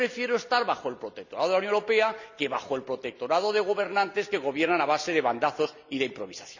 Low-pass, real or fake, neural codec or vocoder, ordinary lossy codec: 7.2 kHz; real; none; none